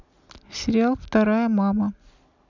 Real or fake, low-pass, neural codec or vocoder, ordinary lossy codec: real; 7.2 kHz; none; none